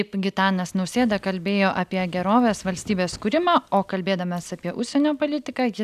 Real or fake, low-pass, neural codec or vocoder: real; 14.4 kHz; none